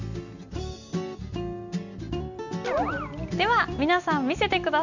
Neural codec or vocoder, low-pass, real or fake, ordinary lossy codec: none; 7.2 kHz; real; none